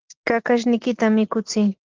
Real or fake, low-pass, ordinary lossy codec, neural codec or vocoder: real; 7.2 kHz; Opus, 16 kbps; none